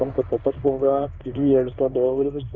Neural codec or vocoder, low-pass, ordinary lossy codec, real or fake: codec, 16 kHz in and 24 kHz out, 1 kbps, XY-Tokenizer; 7.2 kHz; Opus, 64 kbps; fake